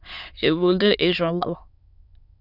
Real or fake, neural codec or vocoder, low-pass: fake; autoencoder, 22.05 kHz, a latent of 192 numbers a frame, VITS, trained on many speakers; 5.4 kHz